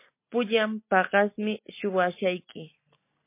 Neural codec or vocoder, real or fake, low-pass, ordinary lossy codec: none; real; 3.6 kHz; MP3, 24 kbps